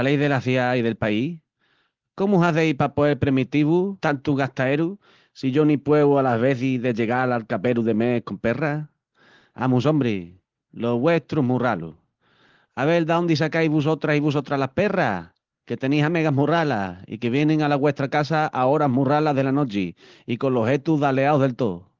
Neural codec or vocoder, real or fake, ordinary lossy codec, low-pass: none; real; Opus, 16 kbps; 7.2 kHz